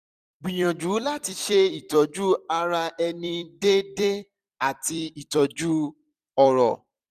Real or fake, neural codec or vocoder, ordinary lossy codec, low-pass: fake; vocoder, 44.1 kHz, 128 mel bands every 256 samples, BigVGAN v2; none; 14.4 kHz